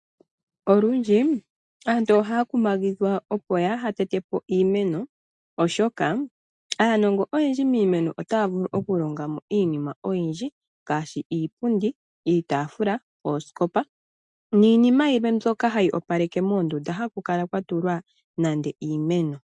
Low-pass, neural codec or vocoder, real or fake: 10.8 kHz; none; real